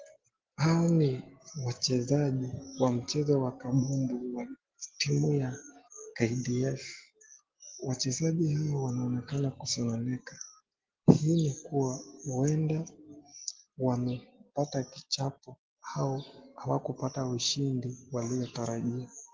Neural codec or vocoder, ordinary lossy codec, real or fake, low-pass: none; Opus, 16 kbps; real; 7.2 kHz